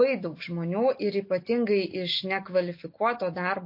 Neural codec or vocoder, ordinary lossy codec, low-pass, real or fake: none; MP3, 32 kbps; 5.4 kHz; real